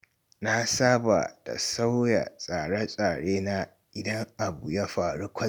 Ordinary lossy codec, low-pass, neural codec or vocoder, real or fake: none; none; none; real